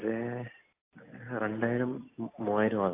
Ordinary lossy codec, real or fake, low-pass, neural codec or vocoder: AAC, 24 kbps; real; 3.6 kHz; none